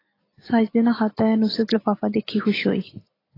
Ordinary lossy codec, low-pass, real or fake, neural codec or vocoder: AAC, 24 kbps; 5.4 kHz; real; none